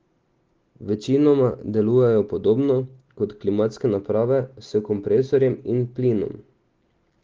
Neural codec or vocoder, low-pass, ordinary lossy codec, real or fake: none; 7.2 kHz; Opus, 16 kbps; real